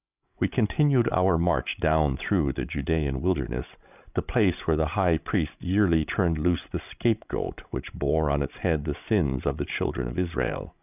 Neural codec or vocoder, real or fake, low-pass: codec, 16 kHz, 16 kbps, FreqCodec, larger model; fake; 3.6 kHz